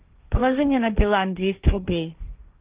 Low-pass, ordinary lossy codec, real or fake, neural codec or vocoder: 3.6 kHz; Opus, 24 kbps; fake; codec, 16 kHz, 1.1 kbps, Voila-Tokenizer